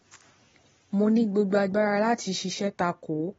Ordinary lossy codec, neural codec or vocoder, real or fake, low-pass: AAC, 24 kbps; vocoder, 44.1 kHz, 128 mel bands every 256 samples, BigVGAN v2; fake; 19.8 kHz